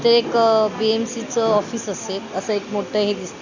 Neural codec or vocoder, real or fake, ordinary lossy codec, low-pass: none; real; none; 7.2 kHz